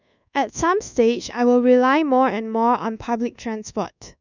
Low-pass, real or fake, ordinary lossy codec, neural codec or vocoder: 7.2 kHz; fake; none; codec, 24 kHz, 1.2 kbps, DualCodec